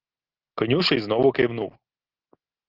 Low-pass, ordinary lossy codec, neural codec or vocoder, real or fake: 5.4 kHz; Opus, 16 kbps; none; real